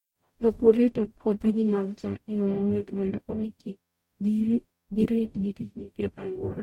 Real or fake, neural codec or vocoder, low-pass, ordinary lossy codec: fake; codec, 44.1 kHz, 0.9 kbps, DAC; 19.8 kHz; MP3, 64 kbps